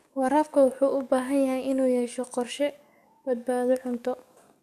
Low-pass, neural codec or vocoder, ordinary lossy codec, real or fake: 14.4 kHz; codec, 44.1 kHz, 7.8 kbps, DAC; none; fake